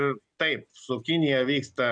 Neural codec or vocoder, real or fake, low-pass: none; real; 9.9 kHz